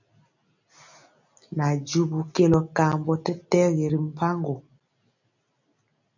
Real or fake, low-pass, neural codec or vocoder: real; 7.2 kHz; none